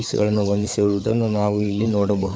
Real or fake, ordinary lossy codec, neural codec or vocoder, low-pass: fake; none; codec, 16 kHz, 4 kbps, FreqCodec, larger model; none